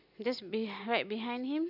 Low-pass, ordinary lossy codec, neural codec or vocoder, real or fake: 5.4 kHz; none; none; real